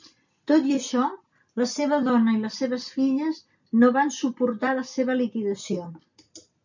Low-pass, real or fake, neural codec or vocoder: 7.2 kHz; real; none